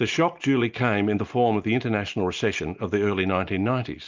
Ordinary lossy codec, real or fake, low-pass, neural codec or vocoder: Opus, 24 kbps; real; 7.2 kHz; none